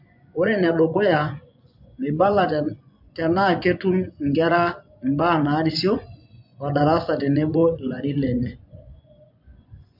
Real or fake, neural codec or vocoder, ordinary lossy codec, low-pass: fake; vocoder, 24 kHz, 100 mel bands, Vocos; MP3, 48 kbps; 5.4 kHz